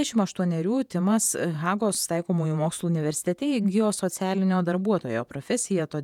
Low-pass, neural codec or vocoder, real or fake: 19.8 kHz; vocoder, 48 kHz, 128 mel bands, Vocos; fake